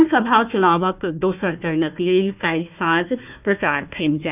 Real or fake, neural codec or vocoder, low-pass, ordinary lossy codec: fake; codec, 16 kHz, 1 kbps, FunCodec, trained on Chinese and English, 50 frames a second; 3.6 kHz; none